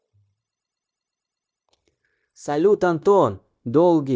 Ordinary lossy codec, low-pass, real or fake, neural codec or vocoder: none; none; fake; codec, 16 kHz, 0.9 kbps, LongCat-Audio-Codec